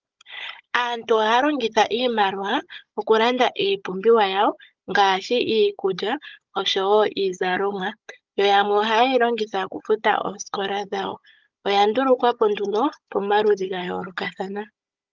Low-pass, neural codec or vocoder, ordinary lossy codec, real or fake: 7.2 kHz; codec, 16 kHz, 16 kbps, FunCodec, trained on Chinese and English, 50 frames a second; Opus, 24 kbps; fake